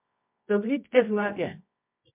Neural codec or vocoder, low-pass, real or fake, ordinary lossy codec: codec, 24 kHz, 0.9 kbps, WavTokenizer, medium music audio release; 3.6 kHz; fake; MP3, 32 kbps